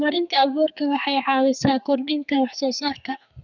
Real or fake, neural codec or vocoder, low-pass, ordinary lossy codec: fake; codec, 32 kHz, 1.9 kbps, SNAC; 7.2 kHz; none